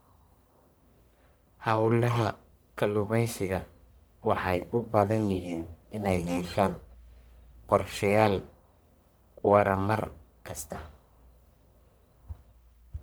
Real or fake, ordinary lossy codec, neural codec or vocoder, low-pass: fake; none; codec, 44.1 kHz, 1.7 kbps, Pupu-Codec; none